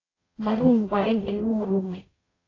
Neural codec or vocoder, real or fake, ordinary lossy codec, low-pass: codec, 44.1 kHz, 0.9 kbps, DAC; fake; AAC, 32 kbps; 7.2 kHz